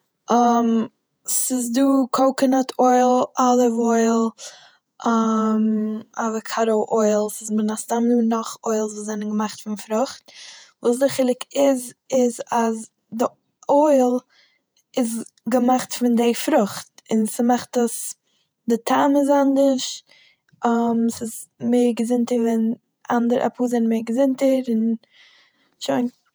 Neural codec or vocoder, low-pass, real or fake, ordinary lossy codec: vocoder, 48 kHz, 128 mel bands, Vocos; none; fake; none